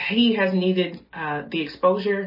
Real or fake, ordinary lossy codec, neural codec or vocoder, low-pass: real; MP3, 24 kbps; none; 5.4 kHz